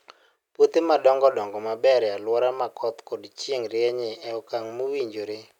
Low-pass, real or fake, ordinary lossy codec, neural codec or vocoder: 19.8 kHz; real; none; none